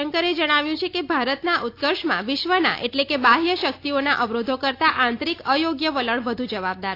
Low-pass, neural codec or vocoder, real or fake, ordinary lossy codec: 5.4 kHz; none; real; AAC, 32 kbps